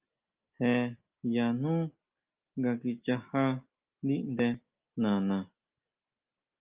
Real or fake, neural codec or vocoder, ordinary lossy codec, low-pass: real; none; Opus, 24 kbps; 3.6 kHz